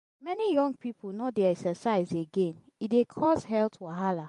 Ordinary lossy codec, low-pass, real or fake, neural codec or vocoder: MP3, 48 kbps; 14.4 kHz; fake; vocoder, 44.1 kHz, 128 mel bands every 512 samples, BigVGAN v2